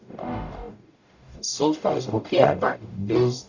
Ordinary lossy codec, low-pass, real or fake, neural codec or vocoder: none; 7.2 kHz; fake; codec, 44.1 kHz, 0.9 kbps, DAC